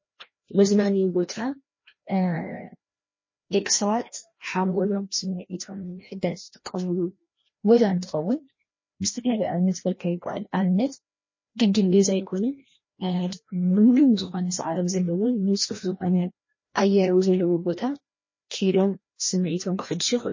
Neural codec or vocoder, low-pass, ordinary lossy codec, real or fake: codec, 16 kHz, 1 kbps, FreqCodec, larger model; 7.2 kHz; MP3, 32 kbps; fake